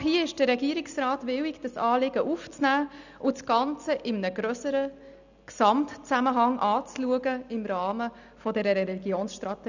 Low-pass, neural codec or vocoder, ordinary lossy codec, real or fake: 7.2 kHz; none; none; real